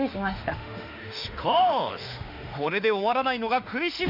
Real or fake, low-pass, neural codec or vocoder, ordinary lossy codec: fake; 5.4 kHz; autoencoder, 48 kHz, 32 numbers a frame, DAC-VAE, trained on Japanese speech; none